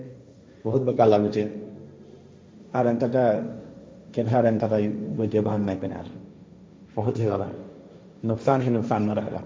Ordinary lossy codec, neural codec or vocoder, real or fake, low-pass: none; codec, 16 kHz, 1.1 kbps, Voila-Tokenizer; fake; none